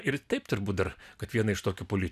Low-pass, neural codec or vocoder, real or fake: 14.4 kHz; none; real